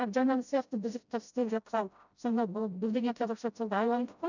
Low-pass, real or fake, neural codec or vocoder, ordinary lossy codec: 7.2 kHz; fake; codec, 16 kHz, 0.5 kbps, FreqCodec, smaller model; none